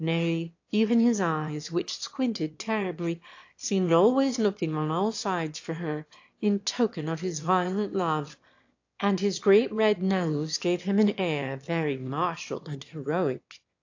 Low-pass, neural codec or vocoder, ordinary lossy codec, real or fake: 7.2 kHz; autoencoder, 22.05 kHz, a latent of 192 numbers a frame, VITS, trained on one speaker; AAC, 48 kbps; fake